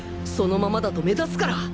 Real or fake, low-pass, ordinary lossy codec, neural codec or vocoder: real; none; none; none